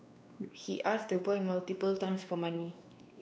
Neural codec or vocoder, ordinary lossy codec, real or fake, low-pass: codec, 16 kHz, 2 kbps, X-Codec, WavLM features, trained on Multilingual LibriSpeech; none; fake; none